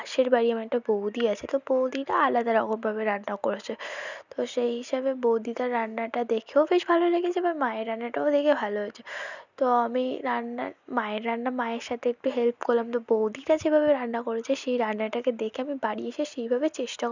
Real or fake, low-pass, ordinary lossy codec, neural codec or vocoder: real; 7.2 kHz; none; none